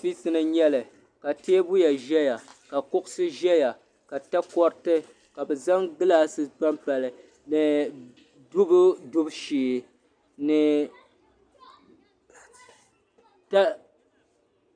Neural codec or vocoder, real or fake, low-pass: none; real; 9.9 kHz